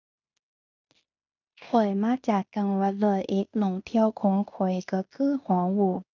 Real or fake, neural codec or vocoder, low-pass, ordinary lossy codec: fake; codec, 16 kHz in and 24 kHz out, 0.9 kbps, LongCat-Audio-Codec, fine tuned four codebook decoder; 7.2 kHz; none